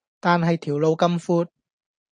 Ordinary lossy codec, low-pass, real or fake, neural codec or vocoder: AAC, 64 kbps; 9.9 kHz; real; none